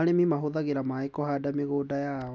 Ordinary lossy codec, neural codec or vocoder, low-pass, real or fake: none; none; none; real